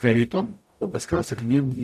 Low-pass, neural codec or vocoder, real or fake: 14.4 kHz; codec, 44.1 kHz, 0.9 kbps, DAC; fake